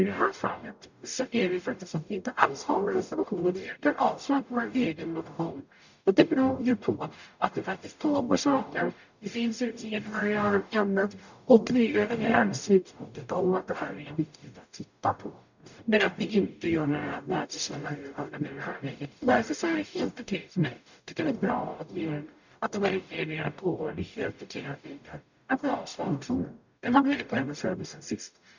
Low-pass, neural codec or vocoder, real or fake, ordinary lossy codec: 7.2 kHz; codec, 44.1 kHz, 0.9 kbps, DAC; fake; none